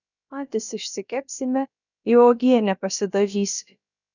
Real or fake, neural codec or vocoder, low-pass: fake; codec, 16 kHz, about 1 kbps, DyCAST, with the encoder's durations; 7.2 kHz